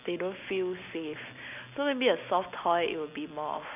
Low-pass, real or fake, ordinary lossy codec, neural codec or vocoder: 3.6 kHz; real; none; none